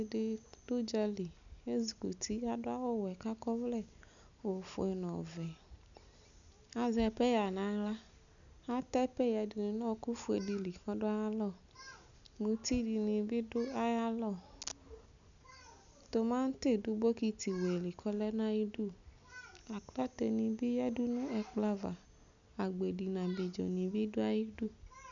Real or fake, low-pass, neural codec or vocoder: real; 7.2 kHz; none